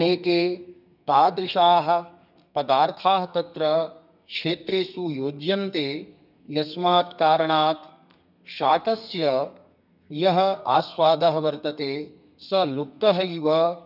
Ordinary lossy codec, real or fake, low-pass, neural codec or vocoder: none; fake; 5.4 kHz; codec, 32 kHz, 1.9 kbps, SNAC